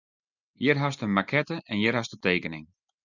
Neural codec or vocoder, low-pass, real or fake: none; 7.2 kHz; real